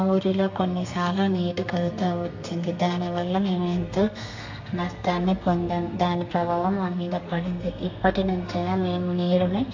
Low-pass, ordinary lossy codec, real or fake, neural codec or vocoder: 7.2 kHz; MP3, 48 kbps; fake; codec, 32 kHz, 1.9 kbps, SNAC